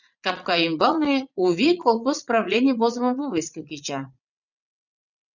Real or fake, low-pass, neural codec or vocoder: fake; 7.2 kHz; vocoder, 44.1 kHz, 80 mel bands, Vocos